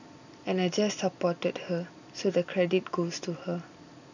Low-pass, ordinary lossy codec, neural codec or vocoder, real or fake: 7.2 kHz; none; none; real